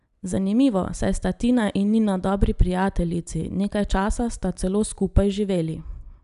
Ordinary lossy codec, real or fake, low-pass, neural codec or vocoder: none; real; 10.8 kHz; none